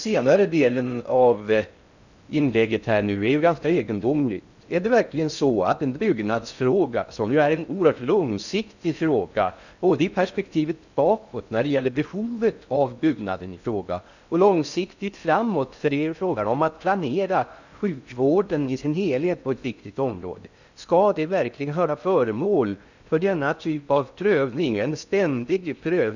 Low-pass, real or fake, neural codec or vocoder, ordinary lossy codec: 7.2 kHz; fake; codec, 16 kHz in and 24 kHz out, 0.6 kbps, FocalCodec, streaming, 4096 codes; none